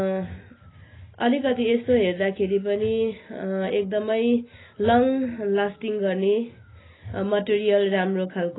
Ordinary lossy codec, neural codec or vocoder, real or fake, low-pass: AAC, 16 kbps; none; real; 7.2 kHz